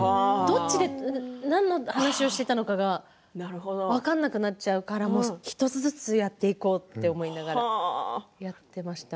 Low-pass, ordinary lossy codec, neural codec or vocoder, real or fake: none; none; none; real